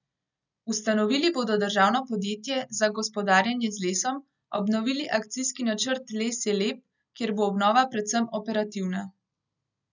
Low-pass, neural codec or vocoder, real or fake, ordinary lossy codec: 7.2 kHz; none; real; none